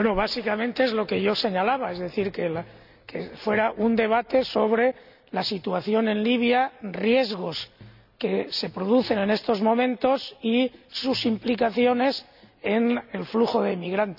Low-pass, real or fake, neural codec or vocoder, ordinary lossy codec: 5.4 kHz; real; none; none